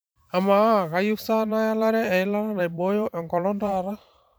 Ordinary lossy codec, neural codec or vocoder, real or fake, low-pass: none; vocoder, 44.1 kHz, 128 mel bands every 512 samples, BigVGAN v2; fake; none